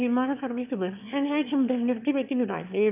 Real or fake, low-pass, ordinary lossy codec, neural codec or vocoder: fake; 3.6 kHz; none; autoencoder, 22.05 kHz, a latent of 192 numbers a frame, VITS, trained on one speaker